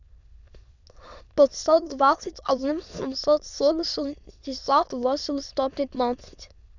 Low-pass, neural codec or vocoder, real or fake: 7.2 kHz; autoencoder, 22.05 kHz, a latent of 192 numbers a frame, VITS, trained on many speakers; fake